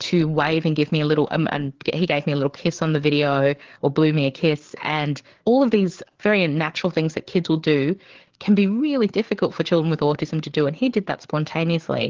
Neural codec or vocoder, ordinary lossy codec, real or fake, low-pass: codec, 16 kHz, 4 kbps, FreqCodec, larger model; Opus, 16 kbps; fake; 7.2 kHz